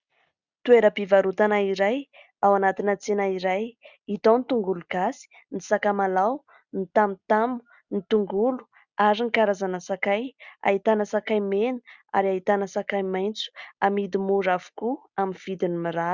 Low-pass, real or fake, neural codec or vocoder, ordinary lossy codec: 7.2 kHz; real; none; Opus, 64 kbps